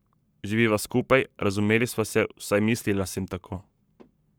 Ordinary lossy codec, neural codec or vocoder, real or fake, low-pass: none; codec, 44.1 kHz, 7.8 kbps, Pupu-Codec; fake; none